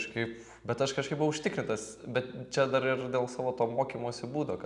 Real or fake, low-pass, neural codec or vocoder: real; 10.8 kHz; none